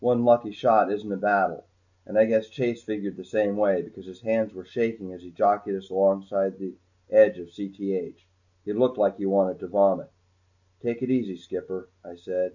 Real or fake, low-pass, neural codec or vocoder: real; 7.2 kHz; none